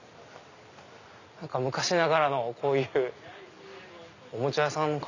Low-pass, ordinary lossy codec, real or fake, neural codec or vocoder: 7.2 kHz; none; real; none